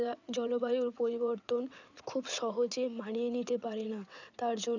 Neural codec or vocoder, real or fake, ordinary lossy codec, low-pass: vocoder, 22.05 kHz, 80 mel bands, WaveNeXt; fake; none; 7.2 kHz